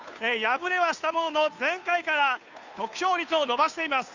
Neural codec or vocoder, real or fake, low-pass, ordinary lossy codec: codec, 16 kHz, 2 kbps, FunCodec, trained on Chinese and English, 25 frames a second; fake; 7.2 kHz; none